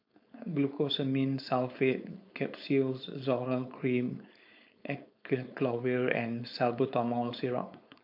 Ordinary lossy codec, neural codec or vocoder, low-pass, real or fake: MP3, 48 kbps; codec, 16 kHz, 4.8 kbps, FACodec; 5.4 kHz; fake